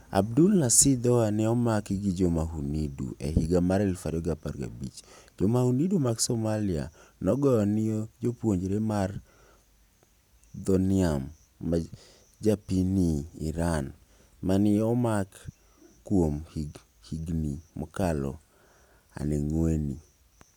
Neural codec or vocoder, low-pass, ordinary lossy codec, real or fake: none; 19.8 kHz; none; real